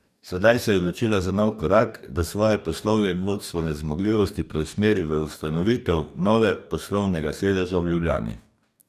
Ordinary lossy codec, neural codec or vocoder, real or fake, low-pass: none; codec, 44.1 kHz, 2.6 kbps, DAC; fake; 14.4 kHz